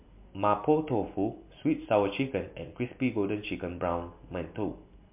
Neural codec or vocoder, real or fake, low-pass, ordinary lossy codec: none; real; 3.6 kHz; MP3, 32 kbps